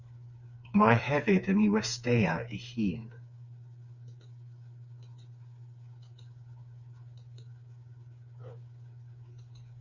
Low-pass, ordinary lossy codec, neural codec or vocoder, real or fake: 7.2 kHz; Opus, 64 kbps; codec, 16 kHz, 4 kbps, FreqCodec, smaller model; fake